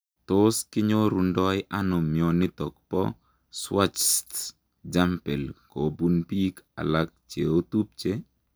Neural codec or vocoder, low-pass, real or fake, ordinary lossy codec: none; none; real; none